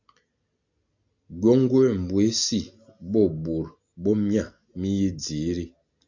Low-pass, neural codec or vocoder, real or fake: 7.2 kHz; none; real